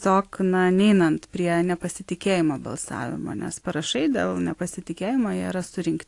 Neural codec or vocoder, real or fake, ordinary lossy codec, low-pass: none; real; AAC, 48 kbps; 10.8 kHz